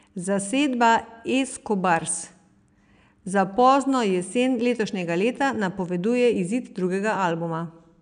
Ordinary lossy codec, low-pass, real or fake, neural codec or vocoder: none; 9.9 kHz; real; none